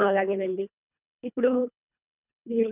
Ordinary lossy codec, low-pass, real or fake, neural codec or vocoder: none; 3.6 kHz; fake; codec, 24 kHz, 1.5 kbps, HILCodec